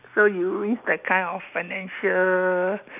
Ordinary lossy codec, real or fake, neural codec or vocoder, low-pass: none; real; none; 3.6 kHz